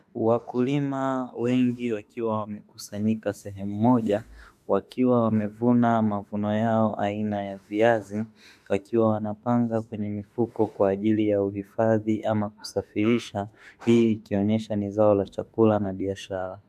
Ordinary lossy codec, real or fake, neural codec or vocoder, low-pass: MP3, 96 kbps; fake; autoencoder, 48 kHz, 32 numbers a frame, DAC-VAE, trained on Japanese speech; 14.4 kHz